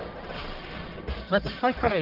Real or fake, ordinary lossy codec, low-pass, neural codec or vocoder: fake; Opus, 32 kbps; 5.4 kHz; codec, 44.1 kHz, 1.7 kbps, Pupu-Codec